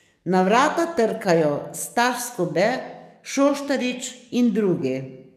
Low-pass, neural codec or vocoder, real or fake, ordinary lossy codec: 14.4 kHz; codec, 44.1 kHz, 7.8 kbps, DAC; fake; none